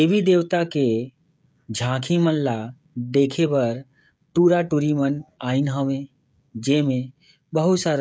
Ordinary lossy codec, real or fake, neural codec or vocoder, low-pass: none; fake; codec, 16 kHz, 16 kbps, FreqCodec, smaller model; none